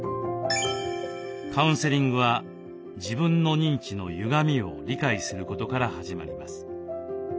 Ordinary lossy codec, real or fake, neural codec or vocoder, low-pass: none; real; none; none